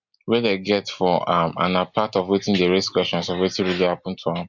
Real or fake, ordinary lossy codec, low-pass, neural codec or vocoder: real; MP3, 64 kbps; 7.2 kHz; none